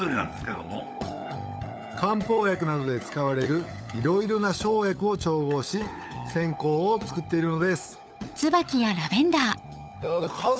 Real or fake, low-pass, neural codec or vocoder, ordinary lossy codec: fake; none; codec, 16 kHz, 16 kbps, FunCodec, trained on LibriTTS, 50 frames a second; none